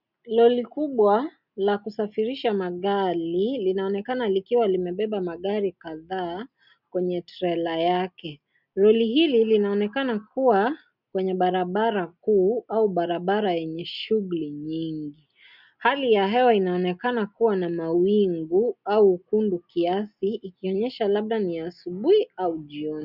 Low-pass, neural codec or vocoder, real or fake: 5.4 kHz; none; real